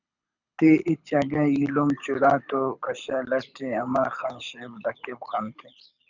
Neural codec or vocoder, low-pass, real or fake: codec, 24 kHz, 6 kbps, HILCodec; 7.2 kHz; fake